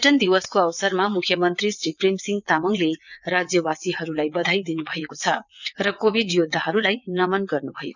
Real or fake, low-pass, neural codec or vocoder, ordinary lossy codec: fake; 7.2 kHz; vocoder, 22.05 kHz, 80 mel bands, WaveNeXt; none